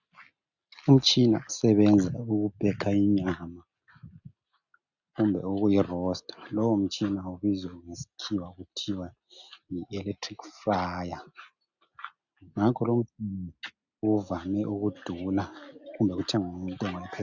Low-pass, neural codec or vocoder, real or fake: 7.2 kHz; none; real